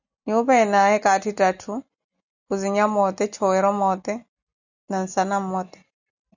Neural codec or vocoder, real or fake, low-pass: none; real; 7.2 kHz